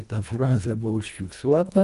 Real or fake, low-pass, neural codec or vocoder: fake; 10.8 kHz; codec, 24 kHz, 1.5 kbps, HILCodec